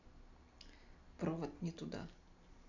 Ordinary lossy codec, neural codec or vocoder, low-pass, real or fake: none; none; 7.2 kHz; real